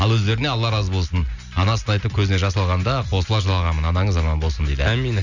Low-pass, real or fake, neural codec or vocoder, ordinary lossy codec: 7.2 kHz; real; none; none